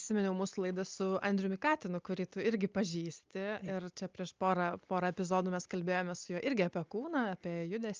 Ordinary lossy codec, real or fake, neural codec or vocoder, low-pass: Opus, 24 kbps; real; none; 7.2 kHz